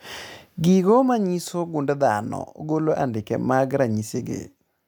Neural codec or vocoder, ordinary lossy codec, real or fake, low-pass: none; none; real; none